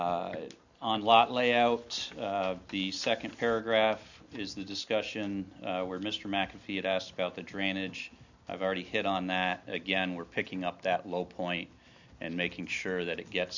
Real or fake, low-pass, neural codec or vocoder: real; 7.2 kHz; none